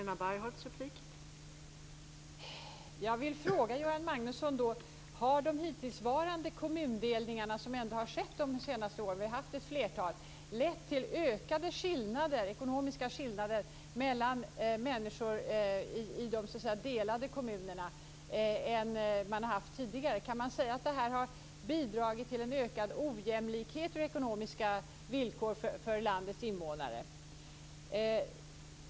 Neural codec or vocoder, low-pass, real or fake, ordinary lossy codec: none; none; real; none